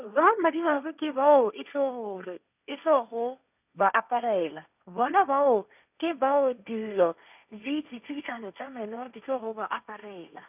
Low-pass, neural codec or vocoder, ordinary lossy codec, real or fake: 3.6 kHz; codec, 16 kHz, 1.1 kbps, Voila-Tokenizer; none; fake